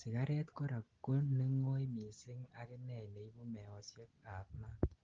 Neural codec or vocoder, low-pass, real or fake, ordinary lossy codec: none; 7.2 kHz; real; Opus, 16 kbps